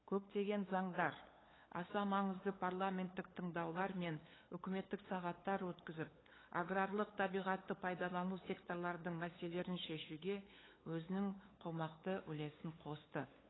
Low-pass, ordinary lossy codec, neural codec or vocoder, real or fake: 7.2 kHz; AAC, 16 kbps; codec, 16 kHz, 8 kbps, FunCodec, trained on LibriTTS, 25 frames a second; fake